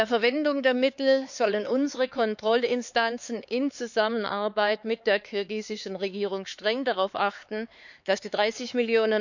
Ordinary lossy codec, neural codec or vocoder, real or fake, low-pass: none; codec, 16 kHz, 4 kbps, X-Codec, HuBERT features, trained on LibriSpeech; fake; 7.2 kHz